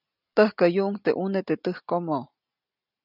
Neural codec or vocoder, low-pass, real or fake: none; 5.4 kHz; real